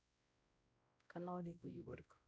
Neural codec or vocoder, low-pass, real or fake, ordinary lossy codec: codec, 16 kHz, 0.5 kbps, X-Codec, WavLM features, trained on Multilingual LibriSpeech; none; fake; none